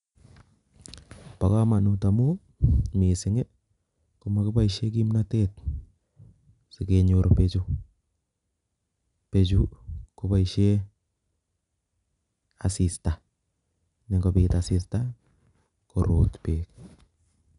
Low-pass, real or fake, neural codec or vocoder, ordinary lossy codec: 10.8 kHz; real; none; none